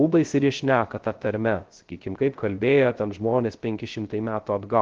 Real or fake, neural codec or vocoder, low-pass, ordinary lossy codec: fake; codec, 16 kHz, 0.3 kbps, FocalCodec; 7.2 kHz; Opus, 16 kbps